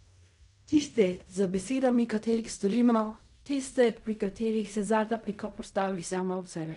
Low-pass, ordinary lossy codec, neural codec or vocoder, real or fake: 10.8 kHz; MP3, 96 kbps; codec, 16 kHz in and 24 kHz out, 0.4 kbps, LongCat-Audio-Codec, fine tuned four codebook decoder; fake